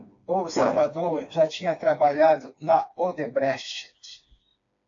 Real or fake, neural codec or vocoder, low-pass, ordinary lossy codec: fake; codec, 16 kHz, 2 kbps, FreqCodec, smaller model; 7.2 kHz; AAC, 48 kbps